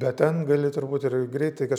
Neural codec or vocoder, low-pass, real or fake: none; 19.8 kHz; real